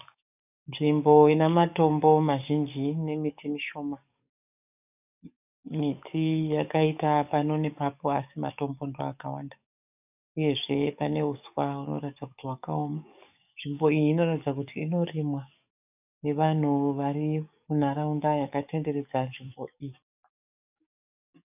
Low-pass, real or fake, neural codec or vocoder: 3.6 kHz; fake; codec, 44.1 kHz, 7.8 kbps, DAC